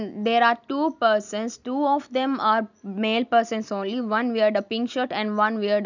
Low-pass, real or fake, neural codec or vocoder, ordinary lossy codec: 7.2 kHz; real; none; none